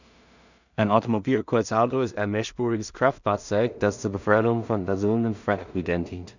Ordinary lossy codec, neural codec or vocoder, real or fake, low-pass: none; codec, 16 kHz in and 24 kHz out, 0.4 kbps, LongCat-Audio-Codec, two codebook decoder; fake; 7.2 kHz